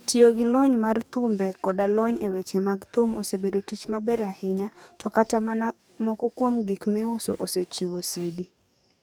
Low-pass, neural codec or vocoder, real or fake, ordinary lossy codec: none; codec, 44.1 kHz, 2.6 kbps, DAC; fake; none